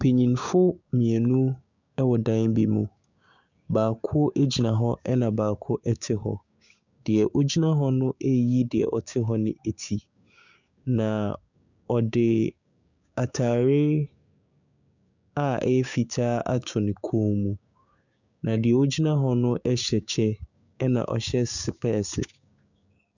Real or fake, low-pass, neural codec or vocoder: fake; 7.2 kHz; codec, 16 kHz, 6 kbps, DAC